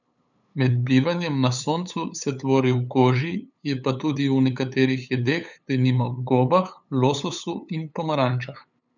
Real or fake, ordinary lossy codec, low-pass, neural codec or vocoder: fake; none; 7.2 kHz; codec, 16 kHz, 8 kbps, FunCodec, trained on LibriTTS, 25 frames a second